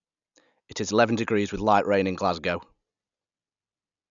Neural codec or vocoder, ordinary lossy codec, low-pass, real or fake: none; none; 7.2 kHz; real